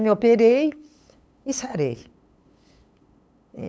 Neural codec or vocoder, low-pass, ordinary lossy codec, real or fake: codec, 16 kHz, 8 kbps, FunCodec, trained on LibriTTS, 25 frames a second; none; none; fake